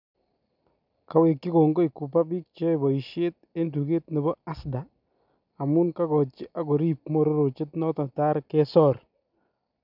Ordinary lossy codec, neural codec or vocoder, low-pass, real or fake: none; none; 5.4 kHz; real